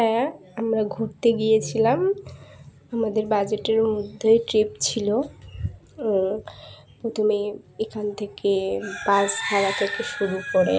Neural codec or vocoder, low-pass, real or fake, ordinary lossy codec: none; none; real; none